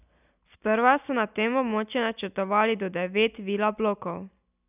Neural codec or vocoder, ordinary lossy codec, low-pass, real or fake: none; none; 3.6 kHz; real